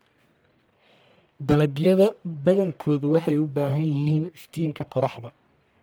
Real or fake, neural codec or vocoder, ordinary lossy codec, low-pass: fake; codec, 44.1 kHz, 1.7 kbps, Pupu-Codec; none; none